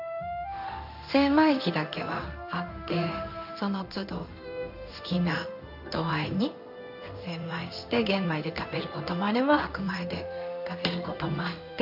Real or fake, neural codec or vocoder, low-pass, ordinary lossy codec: fake; codec, 16 kHz in and 24 kHz out, 1 kbps, XY-Tokenizer; 5.4 kHz; none